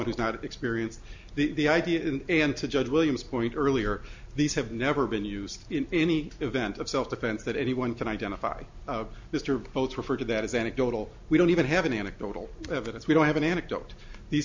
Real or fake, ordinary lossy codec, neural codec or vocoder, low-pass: real; MP3, 64 kbps; none; 7.2 kHz